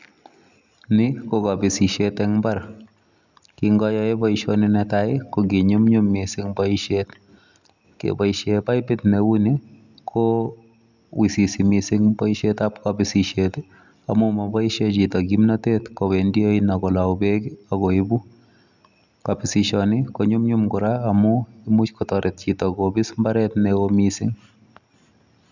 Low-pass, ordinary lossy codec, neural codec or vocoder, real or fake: 7.2 kHz; none; none; real